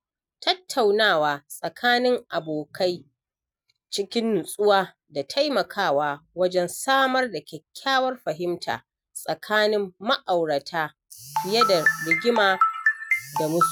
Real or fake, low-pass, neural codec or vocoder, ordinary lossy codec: real; none; none; none